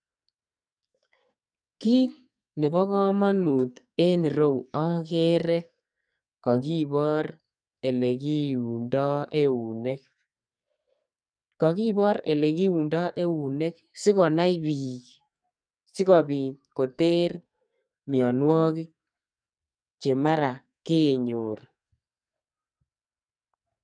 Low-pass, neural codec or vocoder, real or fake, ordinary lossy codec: 9.9 kHz; codec, 44.1 kHz, 2.6 kbps, SNAC; fake; none